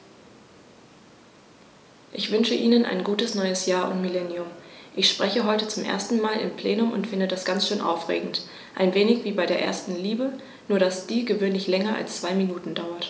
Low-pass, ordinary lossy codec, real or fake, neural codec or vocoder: none; none; real; none